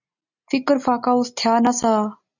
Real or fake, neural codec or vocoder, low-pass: real; none; 7.2 kHz